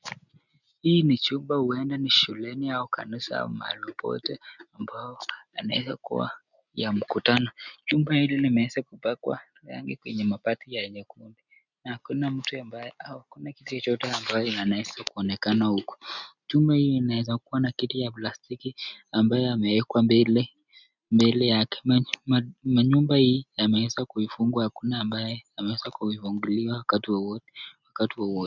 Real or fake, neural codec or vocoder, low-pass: real; none; 7.2 kHz